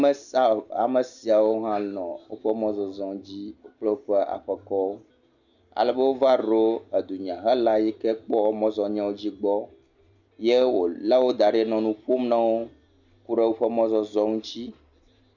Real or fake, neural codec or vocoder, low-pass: real; none; 7.2 kHz